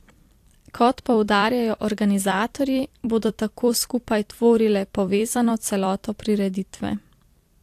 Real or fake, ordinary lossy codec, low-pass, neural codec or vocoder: fake; AAC, 64 kbps; 14.4 kHz; vocoder, 44.1 kHz, 128 mel bands every 256 samples, BigVGAN v2